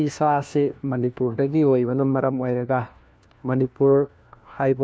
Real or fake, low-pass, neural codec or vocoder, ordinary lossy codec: fake; none; codec, 16 kHz, 1 kbps, FunCodec, trained on LibriTTS, 50 frames a second; none